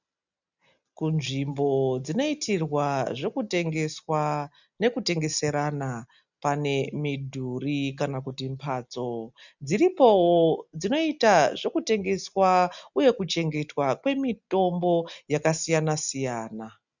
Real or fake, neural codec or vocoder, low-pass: real; none; 7.2 kHz